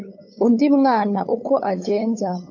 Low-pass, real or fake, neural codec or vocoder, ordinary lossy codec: 7.2 kHz; fake; codec, 16 kHz, 8 kbps, FunCodec, trained on LibriTTS, 25 frames a second; AAC, 48 kbps